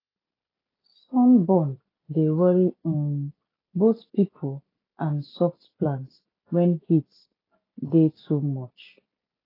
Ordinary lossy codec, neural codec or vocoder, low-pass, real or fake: AAC, 24 kbps; none; 5.4 kHz; real